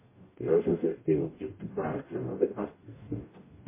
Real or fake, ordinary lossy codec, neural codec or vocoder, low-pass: fake; MP3, 16 kbps; codec, 44.1 kHz, 0.9 kbps, DAC; 3.6 kHz